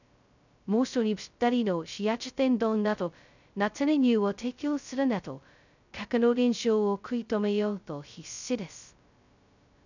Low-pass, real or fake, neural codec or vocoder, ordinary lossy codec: 7.2 kHz; fake; codec, 16 kHz, 0.2 kbps, FocalCodec; none